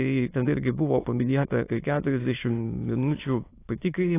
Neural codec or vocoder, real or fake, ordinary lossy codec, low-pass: autoencoder, 22.05 kHz, a latent of 192 numbers a frame, VITS, trained on many speakers; fake; AAC, 24 kbps; 3.6 kHz